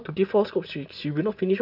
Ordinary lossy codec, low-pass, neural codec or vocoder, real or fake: none; 5.4 kHz; none; real